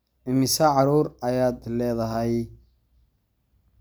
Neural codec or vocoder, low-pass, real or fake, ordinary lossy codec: none; none; real; none